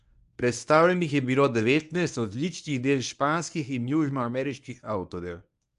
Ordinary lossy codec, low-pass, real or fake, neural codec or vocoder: none; 10.8 kHz; fake; codec, 24 kHz, 0.9 kbps, WavTokenizer, medium speech release version 1